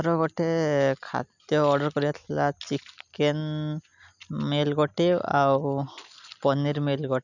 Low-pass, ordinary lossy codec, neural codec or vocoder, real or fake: 7.2 kHz; none; none; real